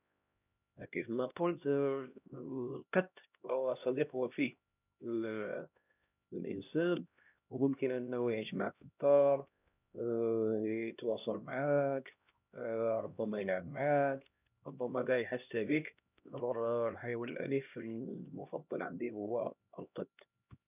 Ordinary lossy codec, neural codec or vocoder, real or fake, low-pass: none; codec, 16 kHz, 1 kbps, X-Codec, HuBERT features, trained on LibriSpeech; fake; 3.6 kHz